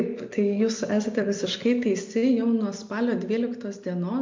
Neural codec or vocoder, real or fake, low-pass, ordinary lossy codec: none; real; 7.2 kHz; MP3, 48 kbps